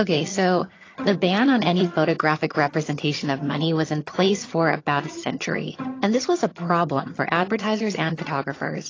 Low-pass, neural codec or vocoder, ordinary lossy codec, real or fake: 7.2 kHz; vocoder, 22.05 kHz, 80 mel bands, HiFi-GAN; AAC, 32 kbps; fake